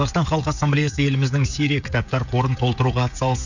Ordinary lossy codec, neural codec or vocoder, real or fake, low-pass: none; codec, 16 kHz, 16 kbps, FreqCodec, smaller model; fake; 7.2 kHz